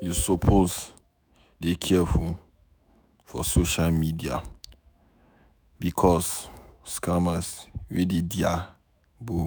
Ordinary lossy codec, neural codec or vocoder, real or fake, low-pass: none; autoencoder, 48 kHz, 128 numbers a frame, DAC-VAE, trained on Japanese speech; fake; none